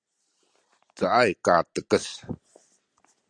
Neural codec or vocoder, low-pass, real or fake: none; 9.9 kHz; real